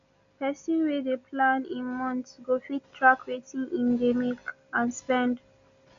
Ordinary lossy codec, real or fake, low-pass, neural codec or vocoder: AAC, 96 kbps; real; 7.2 kHz; none